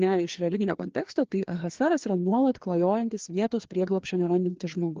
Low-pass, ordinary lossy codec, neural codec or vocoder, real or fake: 7.2 kHz; Opus, 16 kbps; codec, 16 kHz, 2 kbps, FreqCodec, larger model; fake